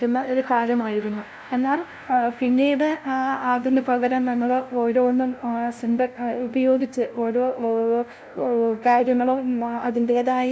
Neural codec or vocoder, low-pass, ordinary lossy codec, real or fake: codec, 16 kHz, 0.5 kbps, FunCodec, trained on LibriTTS, 25 frames a second; none; none; fake